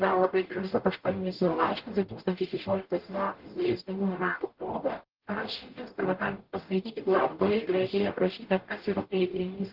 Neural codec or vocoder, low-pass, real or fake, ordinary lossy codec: codec, 44.1 kHz, 0.9 kbps, DAC; 5.4 kHz; fake; Opus, 16 kbps